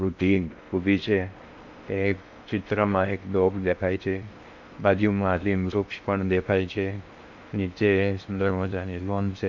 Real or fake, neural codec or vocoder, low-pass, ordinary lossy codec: fake; codec, 16 kHz in and 24 kHz out, 0.6 kbps, FocalCodec, streaming, 4096 codes; 7.2 kHz; none